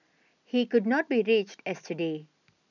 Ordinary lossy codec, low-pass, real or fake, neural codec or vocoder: none; 7.2 kHz; real; none